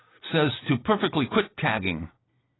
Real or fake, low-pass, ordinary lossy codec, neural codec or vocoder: real; 7.2 kHz; AAC, 16 kbps; none